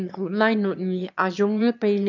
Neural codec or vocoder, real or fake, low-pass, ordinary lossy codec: autoencoder, 22.05 kHz, a latent of 192 numbers a frame, VITS, trained on one speaker; fake; 7.2 kHz; none